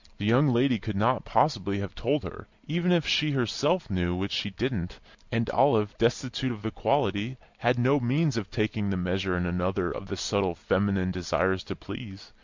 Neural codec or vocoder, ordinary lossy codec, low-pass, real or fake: none; MP3, 64 kbps; 7.2 kHz; real